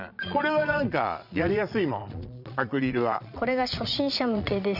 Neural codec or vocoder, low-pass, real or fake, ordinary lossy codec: vocoder, 22.05 kHz, 80 mel bands, Vocos; 5.4 kHz; fake; none